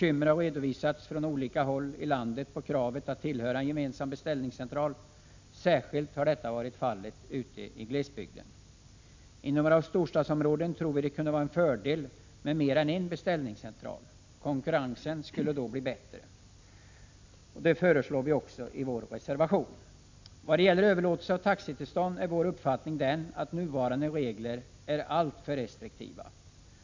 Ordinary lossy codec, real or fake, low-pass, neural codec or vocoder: MP3, 64 kbps; real; 7.2 kHz; none